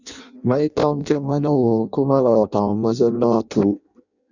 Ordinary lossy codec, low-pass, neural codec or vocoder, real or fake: Opus, 64 kbps; 7.2 kHz; codec, 16 kHz in and 24 kHz out, 0.6 kbps, FireRedTTS-2 codec; fake